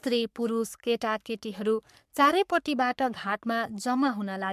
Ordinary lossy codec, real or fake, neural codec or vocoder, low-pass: MP3, 96 kbps; fake; codec, 44.1 kHz, 3.4 kbps, Pupu-Codec; 14.4 kHz